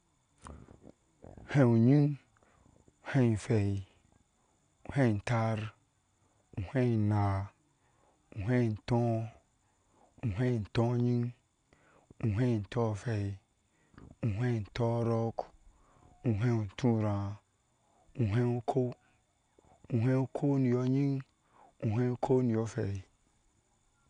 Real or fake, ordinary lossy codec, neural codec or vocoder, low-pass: real; none; none; 9.9 kHz